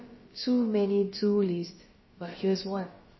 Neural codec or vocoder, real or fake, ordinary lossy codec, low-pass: codec, 16 kHz, about 1 kbps, DyCAST, with the encoder's durations; fake; MP3, 24 kbps; 7.2 kHz